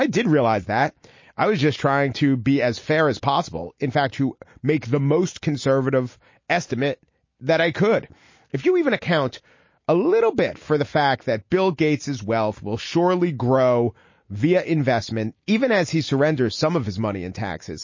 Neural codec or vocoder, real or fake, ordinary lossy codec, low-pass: none; real; MP3, 32 kbps; 7.2 kHz